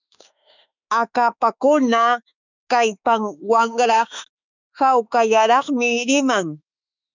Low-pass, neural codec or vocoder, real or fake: 7.2 kHz; autoencoder, 48 kHz, 32 numbers a frame, DAC-VAE, trained on Japanese speech; fake